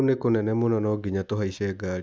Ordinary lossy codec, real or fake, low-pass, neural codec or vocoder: none; real; none; none